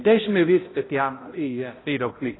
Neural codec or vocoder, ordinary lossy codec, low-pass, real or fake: codec, 16 kHz, 0.5 kbps, X-Codec, HuBERT features, trained on balanced general audio; AAC, 16 kbps; 7.2 kHz; fake